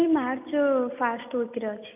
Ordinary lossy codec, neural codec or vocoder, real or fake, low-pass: none; none; real; 3.6 kHz